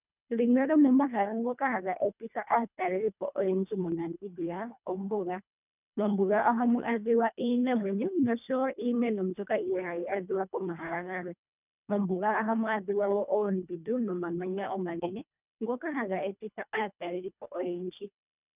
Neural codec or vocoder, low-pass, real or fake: codec, 24 kHz, 1.5 kbps, HILCodec; 3.6 kHz; fake